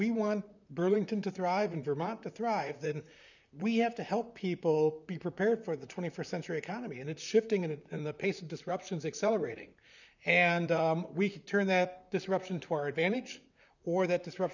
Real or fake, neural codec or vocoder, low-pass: fake; vocoder, 44.1 kHz, 128 mel bands, Pupu-Vocoder; 7.2 kHz